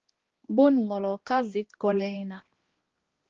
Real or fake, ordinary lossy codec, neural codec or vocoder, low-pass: fake; Opus, 16 kbps; codec, 16 kHz, 1 kbps, X-Codec, HuBERT features, trained on balanced general audio; 7.2 kHz